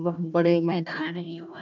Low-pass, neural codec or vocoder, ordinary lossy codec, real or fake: 7.2 kHz; codec, 16 kHz, 1 kbps, FunCodec, trained on Chinese and English, 50 frames a second; none; fake